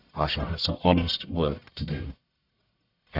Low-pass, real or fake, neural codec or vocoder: 5.4 kHz; fake; codec, 44.1 kHz, 1.7 kbps, Pupu-Codec